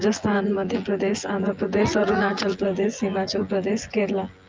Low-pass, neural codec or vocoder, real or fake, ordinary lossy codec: 7.2 kHz; vocoder, 24 kHz, 100 mel bands, Vocos; fake; Opus, 24 kbps